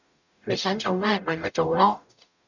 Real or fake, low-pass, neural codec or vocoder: fake; 7.2 kHz; codec, 44.1 kHz, 0.9 kbps, DAC